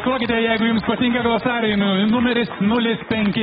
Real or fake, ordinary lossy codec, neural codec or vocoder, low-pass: real; AAC, 16 kbps; none; 19.8 kHz